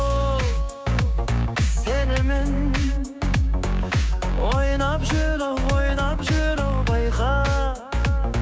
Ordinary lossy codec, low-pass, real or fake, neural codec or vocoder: none; none; fake; codec, 16 kHz, 6 kbps, DAC